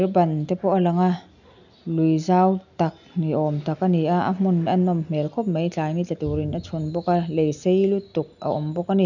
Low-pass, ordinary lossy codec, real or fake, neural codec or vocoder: 7.2 kHz; none; real; none